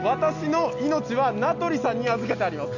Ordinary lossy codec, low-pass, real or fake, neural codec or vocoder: none; 7.2 kHz; real; none